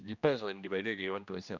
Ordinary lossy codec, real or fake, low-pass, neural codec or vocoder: none; fake; 7.2 kHz; codec, 16 kHz, 1 kbps, X-Codec, HuBERT features, trained on general audio